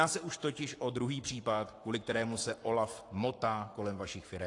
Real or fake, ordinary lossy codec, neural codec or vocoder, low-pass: fake; AAC, 48 kbps; codec, 44.1 kHz, 7.8 kbps, Pupu-Codec; 10.8 kHz